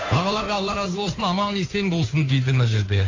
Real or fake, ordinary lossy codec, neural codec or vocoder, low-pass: fake; AAC, 32 kbps; codec, 16 kHz, 2 kbps, FunCodec, trained on Chinese and English, 25 frames a second; 7.2 kHz